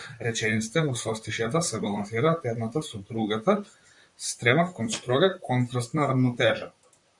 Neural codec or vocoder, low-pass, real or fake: vocoder, 44.1 kHz, 128 mel bands, Pupu-Vocoder; 10.8 kHz; fake